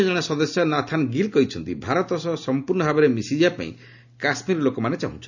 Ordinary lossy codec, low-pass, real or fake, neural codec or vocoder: none; 7.2 kHz; real; none